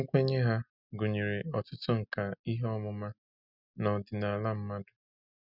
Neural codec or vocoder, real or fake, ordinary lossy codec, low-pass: none; real; none; 5.4 kHz